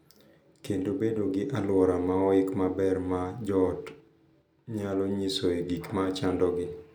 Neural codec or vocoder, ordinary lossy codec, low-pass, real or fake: none; none; none; real